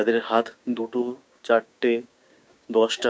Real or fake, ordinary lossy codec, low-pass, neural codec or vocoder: fake; none; none; codec, 16 kHz, 6 kbps, DAC